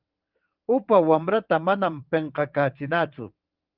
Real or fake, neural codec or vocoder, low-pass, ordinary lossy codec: fake; vocoder, 22.05 kHz, 80 mel bands, WaveNeXt; 5.4 kHz; Opus, 24 kbps